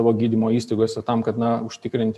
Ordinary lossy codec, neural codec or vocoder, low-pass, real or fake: AAC, 96 kbps; none; 14.4 kHz; real